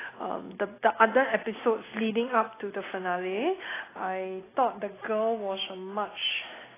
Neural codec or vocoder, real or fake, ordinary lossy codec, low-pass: none; real; AAC, 16 kbps; 3.6 kHz